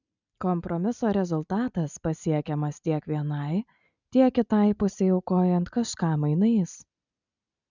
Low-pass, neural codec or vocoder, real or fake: 7.2 kHz; none; real